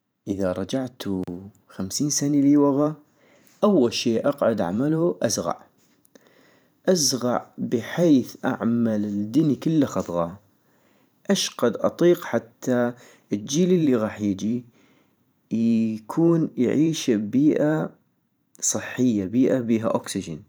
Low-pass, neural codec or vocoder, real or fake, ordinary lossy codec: none; vocoder, 44.1 kHz, 128 mel bands every 512 samples, BigVGAN v2; fake; none